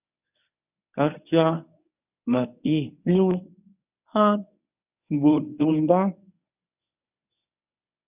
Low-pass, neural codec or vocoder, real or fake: 3.6 kHz; codec, 24 kHz, 0.9 kbps, WavTokenizer, medium speech release version 1; fake